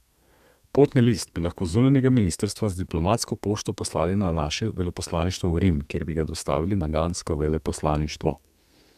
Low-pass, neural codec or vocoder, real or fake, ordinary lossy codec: 14.4 kHz; codec, 32 kHz, 1.9 kbps, SNAC; fake; none